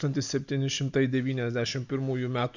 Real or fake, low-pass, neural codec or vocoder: real; 7.2 kHz; none